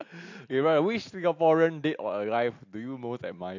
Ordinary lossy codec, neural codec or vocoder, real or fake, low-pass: AAC, 48 kbps; none; real; 7.2 kHz